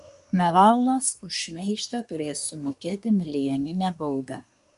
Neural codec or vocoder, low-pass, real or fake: codec, 24 kHz, 1 kbps, SNAC; 10.8 kHz; fake